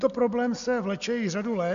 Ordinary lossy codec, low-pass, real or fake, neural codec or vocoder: AAC, 96 kbps; 7.2 kHz; real; none